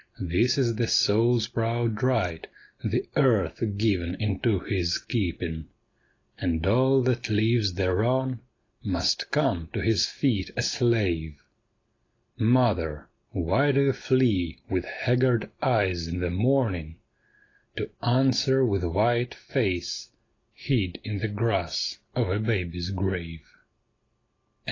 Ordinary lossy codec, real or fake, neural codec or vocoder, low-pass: AAC, 32 kbps; real; none; 7.2 kHz